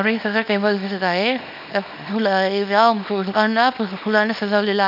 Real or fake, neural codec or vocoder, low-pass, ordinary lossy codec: fake; codec, 24 kHz, 0.9 kbps, WavTokenizer, small release; 5.4 kHz; none